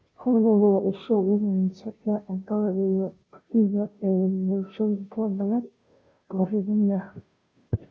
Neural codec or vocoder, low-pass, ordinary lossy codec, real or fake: codec, 16 kHz, 0.5 kbps, FunCodec, trained on Chinese and English, 25 frames a second; none; none; fake